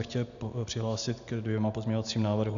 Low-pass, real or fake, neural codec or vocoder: 7.2 kHz; real; none